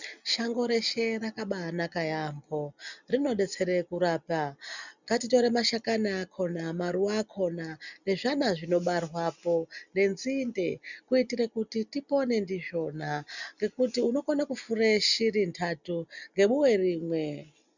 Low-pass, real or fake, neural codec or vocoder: 7.2 kHz; real; none